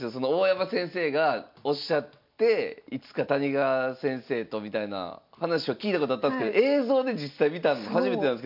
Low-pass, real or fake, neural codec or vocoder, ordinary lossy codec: 5.4 kHz; real; none; MP3, 48 kbps